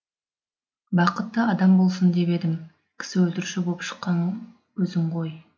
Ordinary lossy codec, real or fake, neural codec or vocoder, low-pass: none; real; none; none